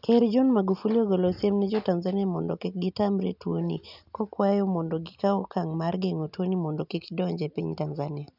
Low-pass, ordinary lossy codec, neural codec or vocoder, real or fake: 5.4 kHz; none; none; real